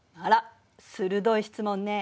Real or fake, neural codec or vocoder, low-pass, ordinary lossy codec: real; none; none; none